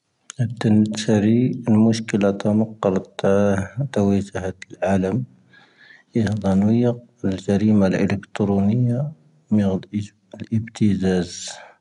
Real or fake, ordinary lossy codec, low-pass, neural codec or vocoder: real; none; 10.8 kHz; none